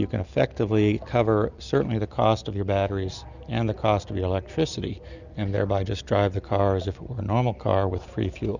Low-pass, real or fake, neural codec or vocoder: 7.2 kHz; real; none